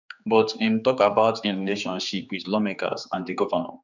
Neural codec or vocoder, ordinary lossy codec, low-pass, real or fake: codec, 16 kHz, 4 kbps, X-Codec, HuBERT features, trained on general audio; none; 7.2 kHz; fake